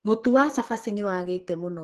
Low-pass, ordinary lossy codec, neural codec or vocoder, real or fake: 14.4 kHz; Opus, 16 kbps; codec, 44.1 kHz, 2.6 kbps, SNAC; fake